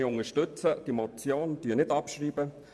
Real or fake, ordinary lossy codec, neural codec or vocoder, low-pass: fake; none; vocoder, 24 kHz, 100 mel bands, Vocos; none